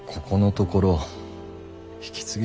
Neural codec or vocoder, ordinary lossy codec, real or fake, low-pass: none; none; real; none